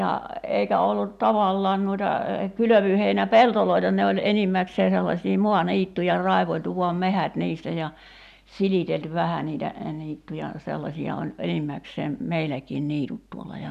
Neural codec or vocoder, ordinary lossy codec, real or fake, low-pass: none; none; real; 14.4 kHz